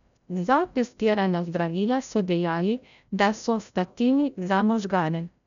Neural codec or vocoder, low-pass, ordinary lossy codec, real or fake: codec, 16 kHz, 0.5 kbps, FreqCodec, larger model; 7.2 kHz; none; fake